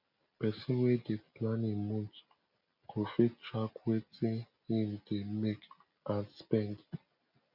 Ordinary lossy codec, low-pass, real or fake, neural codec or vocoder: none; 5.4 kHz; real; none